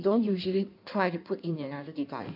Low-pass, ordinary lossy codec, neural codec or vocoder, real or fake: 5.4 kHz; none; codec, 16 kHz in and 24 kHz out, 1.1 kbps, FireRedTTS-2 codec; fake